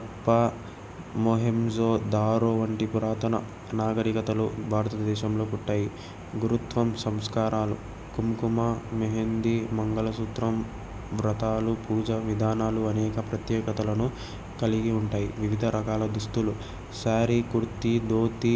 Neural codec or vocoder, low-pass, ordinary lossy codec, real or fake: none; none; none; real